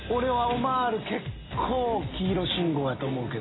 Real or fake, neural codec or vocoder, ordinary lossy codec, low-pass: real; none; AAC, 16 kbps; 7.2 kHz